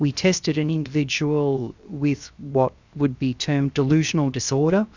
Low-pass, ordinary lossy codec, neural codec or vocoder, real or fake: 7.2 kHz; Opus, 64 kbps; codec, 16 kHz, 0.7 kbps, FocalCodec; fake